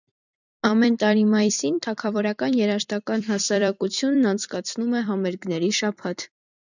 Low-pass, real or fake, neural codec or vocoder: 7.2 kHz; fake; vocoder, 44.1 kHz, 128 mel bands every 256 samples, BigVGAN v2